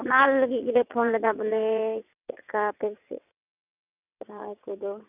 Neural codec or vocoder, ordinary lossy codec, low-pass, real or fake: vocoder, 22.05 kHz, 80 mel bands, WaveNeXt; none; 3.6 kHz; fake